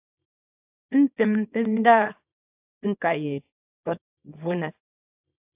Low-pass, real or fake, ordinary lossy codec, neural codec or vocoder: 3.6 kHz; fake; AAC, 32 kbps; codec, 24 kHz, 0.9 kbps, WavTokenizer, small release